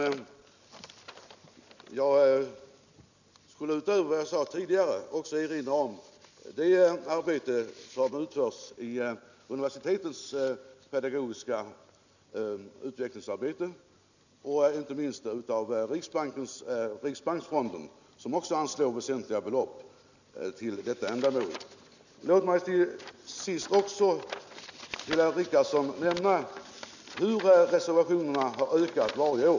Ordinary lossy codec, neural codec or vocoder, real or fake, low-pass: none; none; real; 7.2 kHz